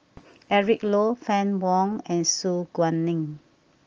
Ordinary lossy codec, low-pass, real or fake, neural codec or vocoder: Opus, 24 kbps; 7.2 kHz; real; none